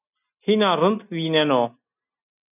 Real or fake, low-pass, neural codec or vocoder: real; 3.6 kHz; none